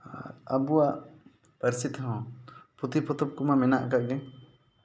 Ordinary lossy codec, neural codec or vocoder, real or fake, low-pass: none; none; real; none